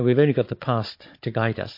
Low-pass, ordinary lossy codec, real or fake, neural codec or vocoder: 5.4 kHz; MP3, 32 kbps; fake; codec, 16 kHz, 16 kbps, FunCodec, trained on Chinese and English, 50 frames a second